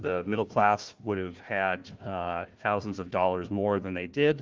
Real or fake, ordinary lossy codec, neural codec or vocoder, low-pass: fake; Opus, 32 kbps; codec, 16 kHz, 1 kbps, FunCodec, trained on Chinese and English, 50 frames a second; 7.2 kHz